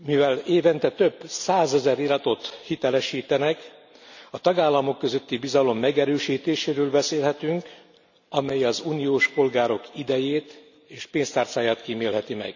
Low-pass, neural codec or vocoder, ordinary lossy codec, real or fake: 7.2 kHz; none; none; real